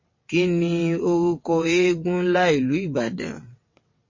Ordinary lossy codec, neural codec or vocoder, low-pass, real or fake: MP3, 32 kbps; vocoder, 22.05 kHz, 80 mel bands, WaveNeXt; 7.2 kHz; fake